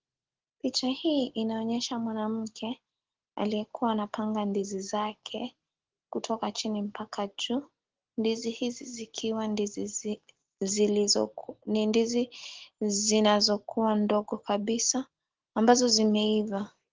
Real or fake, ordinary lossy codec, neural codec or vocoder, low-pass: real; Opus, 16 kbps; none; 7.2 kHz